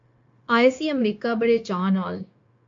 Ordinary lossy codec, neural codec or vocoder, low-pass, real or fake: MP3, 48 kbps; codec, 16 kHz, 0.9 kbps, LongCat-Audio-Codec; 7.2 kHz; fake